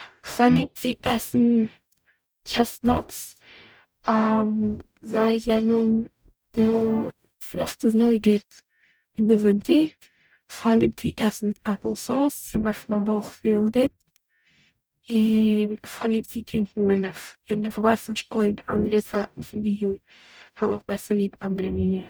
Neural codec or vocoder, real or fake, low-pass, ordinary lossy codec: codec, 44.1 kHz, 0.9 kbps, DAC; fake; none; none